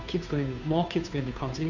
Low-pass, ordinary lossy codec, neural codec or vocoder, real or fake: 7.2 kHz; none; codec, 16 kHz, 2 kbps, FunCodec, trained on Chinese and English, 25 frames a second; fake